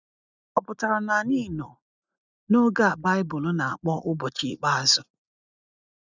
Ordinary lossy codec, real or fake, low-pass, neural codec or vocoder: none; real; 7.2 kHz; none